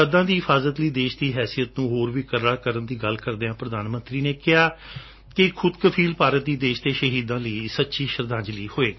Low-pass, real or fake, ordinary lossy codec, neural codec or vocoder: 7.2 kHz; real; MP3, 24 kbps; none